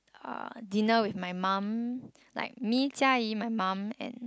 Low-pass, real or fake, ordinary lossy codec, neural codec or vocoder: none; real; none; none